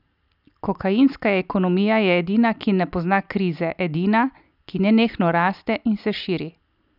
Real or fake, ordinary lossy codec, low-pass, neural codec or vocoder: real; none; 5.4 kHz; none